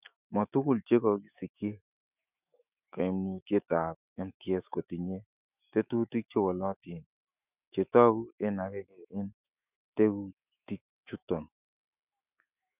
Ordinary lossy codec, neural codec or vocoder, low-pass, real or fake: none; autoencoder, 48 kHz, 128 numbers a frame, DAC-VAE, trained on Japanese speech; 3.6 kHz; fake